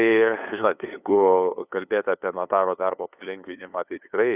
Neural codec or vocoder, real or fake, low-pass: codec, 16 kHz, 2 kbps, FunCodec, trained on LibriTTS, 25 frames a second; fake; 3.6 kHz